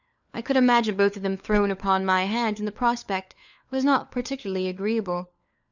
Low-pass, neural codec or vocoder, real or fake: 7.2 kHz; codec, 16 kHz, 4 kbps, FunCodec, trained on LibriTTS, 50 frames a second; fake